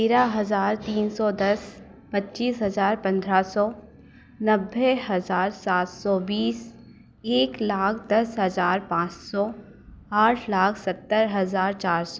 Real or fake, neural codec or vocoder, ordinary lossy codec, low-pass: real; none; none; none